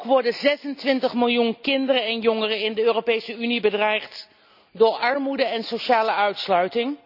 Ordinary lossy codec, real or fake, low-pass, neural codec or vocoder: none; real; 5.4 kHz; none